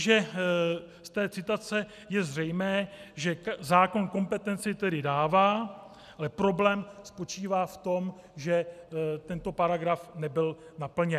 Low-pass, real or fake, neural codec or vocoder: 14.4 kHz; real; none